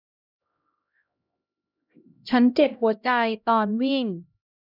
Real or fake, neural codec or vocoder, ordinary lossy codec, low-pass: fake; codec, 16 kHz, 0.5 kbps, X-Codec, HuBERT features, trained on LibriSpeech; none; 5.4 kHz